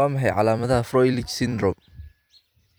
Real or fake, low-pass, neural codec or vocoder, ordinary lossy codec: fake; none; vocoder, 44.1 kHz, 128 mel bands every 256 samples, BigVGAN v2; none